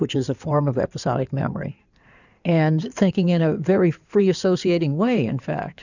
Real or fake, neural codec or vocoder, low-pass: fake; codec, 16 kHz in and 24 kHz out, 2.2 kbps, FireRedTTS-2 codec; 7.2 kHz